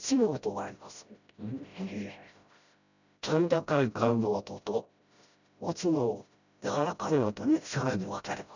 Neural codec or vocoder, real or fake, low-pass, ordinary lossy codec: codec, 16 kHz, 0.5 kbps, FreqCodec, smaller model; fake; 7.2 kHz; none